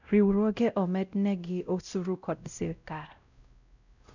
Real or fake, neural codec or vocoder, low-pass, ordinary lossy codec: fake; codec, 16 kHz, 0.5 kbps, X-Codec, WavLM features, trained on Multilingual LibriSpeech; 7.2 kHz; none